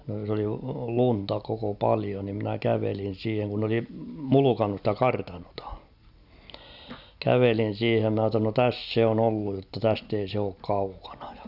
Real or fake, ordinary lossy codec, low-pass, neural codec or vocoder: real; none; 5.4 kHz; none